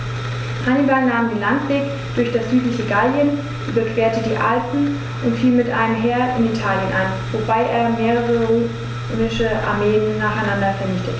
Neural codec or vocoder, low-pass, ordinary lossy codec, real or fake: none; none; none; real